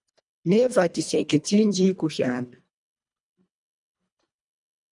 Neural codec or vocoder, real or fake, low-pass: codec, 24 kHz, 1.5 kbps, HILCodec; fake; 10.8 kHz